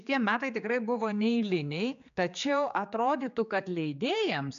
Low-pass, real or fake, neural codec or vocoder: 7.2 kHz; fake; codec, 16 kHz, 4 kbps, X-Codec, HuBERT features, trained on general audio